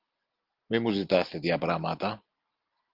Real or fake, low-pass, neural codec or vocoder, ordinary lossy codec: real; 5.4 kHz; none; Opus, 24 kbps